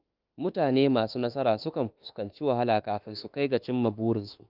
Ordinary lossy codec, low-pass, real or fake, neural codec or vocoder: Opus, 24 kbps; 5.4 kHz; fake; autoencoder, 48 kHz, 32 numbers a frame, DAC-VAE, trained on Japanese speech